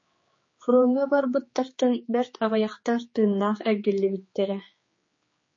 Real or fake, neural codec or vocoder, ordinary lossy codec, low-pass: fake; codec, 16 kHz, 4 kbps, X-Codec, HuBERT features, trained on general audio; MP3, 32 kbps; 7.2 kHz